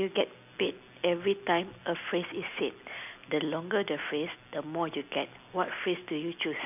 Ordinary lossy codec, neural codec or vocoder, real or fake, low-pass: none; none; real; 3.6 kHz